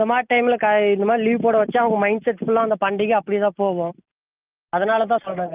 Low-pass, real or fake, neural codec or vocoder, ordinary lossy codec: 3.6 kHz; real; none; Opus, 24 kbps